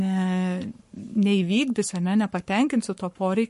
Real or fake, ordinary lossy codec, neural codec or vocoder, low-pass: fake; MP3, 48 kbps; codec, 44.1 kHz, 7.8 kbps, Pupu-Codec; 14.4 kHz